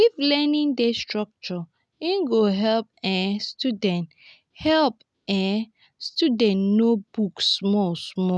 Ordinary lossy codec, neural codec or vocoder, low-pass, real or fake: none; none; none; real